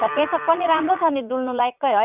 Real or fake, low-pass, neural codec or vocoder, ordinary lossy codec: fake; 3.6 kHz; vocoder, 44.1 kHz, 80 mel bands, Vocos; none